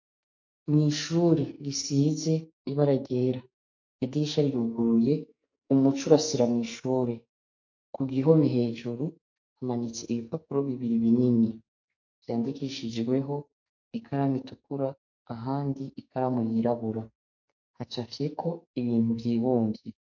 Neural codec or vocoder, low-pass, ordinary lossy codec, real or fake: codec, 44.1 kHz, 2.6 kbps, SNAC; 7.2 kHz; MP3, 48 kbps; fake